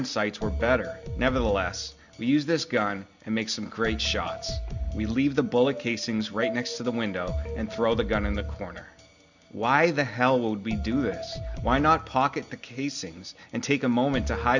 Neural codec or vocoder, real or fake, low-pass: none; real; 7.2 kHz